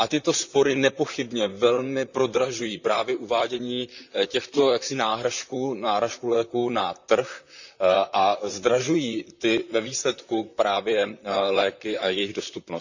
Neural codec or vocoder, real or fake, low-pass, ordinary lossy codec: vocoder, 44.1 kHz, 128 mel bands, Pupu-Vocoder; fake; 7.2 kHz; none